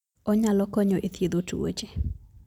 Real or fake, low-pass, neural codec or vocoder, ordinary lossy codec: real; 19.8 kHz; none; none